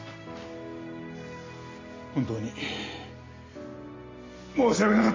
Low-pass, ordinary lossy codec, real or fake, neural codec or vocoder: 7.2 kHz; MP3, 32 kbps; real; none